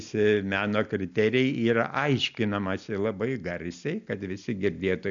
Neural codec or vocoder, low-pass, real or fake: none; 7.2 kHz; real